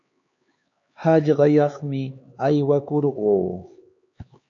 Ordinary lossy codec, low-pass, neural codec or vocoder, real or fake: AAC, 48 kbps; 7.2 kHz; codec, 16 kHz, 4 kbps, X-Codec, HuBERT features, trained on LibriSpeech; fake